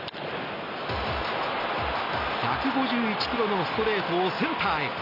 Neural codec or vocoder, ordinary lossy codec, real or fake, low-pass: none; none; real; 5.4 kHz